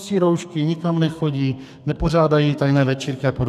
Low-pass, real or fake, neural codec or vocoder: 14.4 kHz; fake; codec, 32 kHz, 1.9 kbps, SNAC